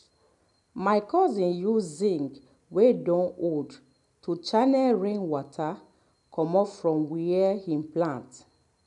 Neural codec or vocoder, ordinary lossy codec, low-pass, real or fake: none; none; 10.8 kHz; real